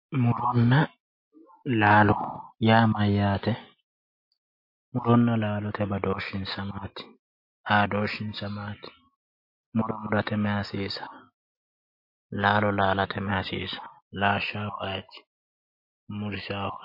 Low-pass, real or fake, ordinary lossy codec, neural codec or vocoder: 5.4 kHz; real; MP3, 32 kbps; none